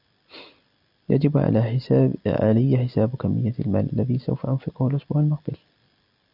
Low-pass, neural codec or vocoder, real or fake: 5.4 kHz; none; real